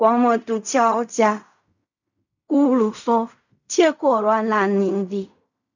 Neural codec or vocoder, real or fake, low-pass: codec, 16 kHz in and 24 kHz out, 0.4 kbps, LongCat-Audio-Codec, fine tuned four codebook decoder; fake; 7.2 kHz